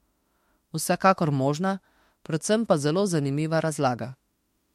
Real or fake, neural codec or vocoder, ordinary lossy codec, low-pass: fake; autoencoder, 48 kHz, 32 numbers a frame, DAC-VAE, trained on Japanese speech; MP3, 64 kbps; 19.8 kHz